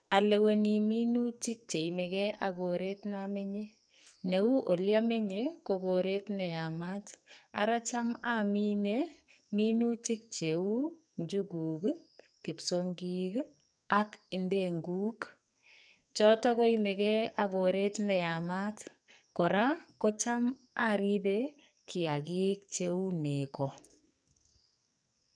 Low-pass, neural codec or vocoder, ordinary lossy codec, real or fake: 9.9 kHz; codec, 44.1 kHz, 2.6 kbps, SNAC; none; fake